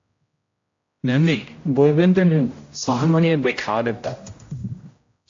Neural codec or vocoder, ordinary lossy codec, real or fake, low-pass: codec, 16 kHz, 0.5 kbps, X-Codec, HuBERT features, trained on general audio; AAC, 48 kbps; fake; 7.2 kHz